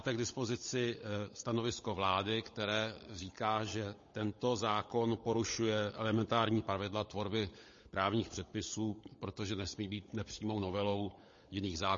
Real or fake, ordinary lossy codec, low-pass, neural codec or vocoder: fake; MP3, 32 kbps; 7.2 kHz; codec, 16 kHz, 16 kbps, FunCodec, trained on LibriTTS, 50 frames a second